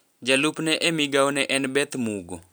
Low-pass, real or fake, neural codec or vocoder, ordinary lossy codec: none; real; none; none